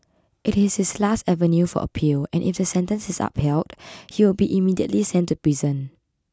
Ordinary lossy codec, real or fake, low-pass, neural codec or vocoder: none; real; none; none